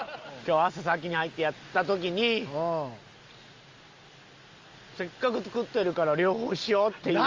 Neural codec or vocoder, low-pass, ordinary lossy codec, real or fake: none; 7.2 kHz; Opus, 32 kbps; real